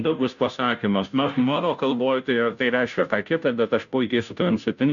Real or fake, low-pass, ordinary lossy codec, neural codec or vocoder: fake; 7.2 kHz; MP3, 96 kbps; codec, 16 kHz, 0.5 kbps, FunCodec, trained on Chinese and English, 25 frames a second